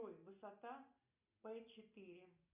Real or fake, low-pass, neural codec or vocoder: real; 3.6 kHz; none